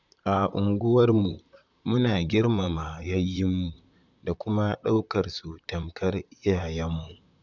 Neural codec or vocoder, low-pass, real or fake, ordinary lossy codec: vocoder, 44.1 kHz, 128 mel bands, Pupu-Vocoder; 7.2 kHz; fake; none